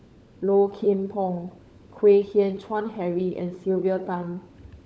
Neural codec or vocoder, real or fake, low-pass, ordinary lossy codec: codec, 16 kHz, 16 kbps, FunCodec, trained on LibriTTS, 50 frames a second; fake; none; none